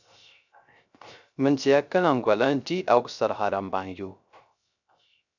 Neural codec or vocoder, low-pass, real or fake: codec, 16 kHz, 0.3 kbps, FocalCodec; 7.2 kHz; fake